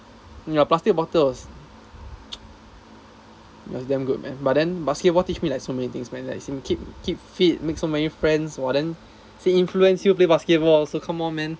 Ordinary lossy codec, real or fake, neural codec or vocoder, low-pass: none; real; none; none